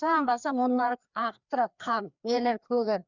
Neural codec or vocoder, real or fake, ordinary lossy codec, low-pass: codec, 16 kHz, 2 kbps, FreqCodec, larger model; fake; none; 7.2 kHz